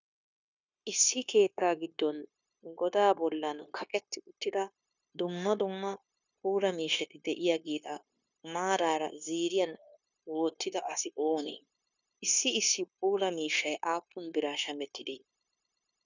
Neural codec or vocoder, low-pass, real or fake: codec, 16 kHz, 0.9 kbps, LongCat-Audio-Codec; 7.2 kHz; fake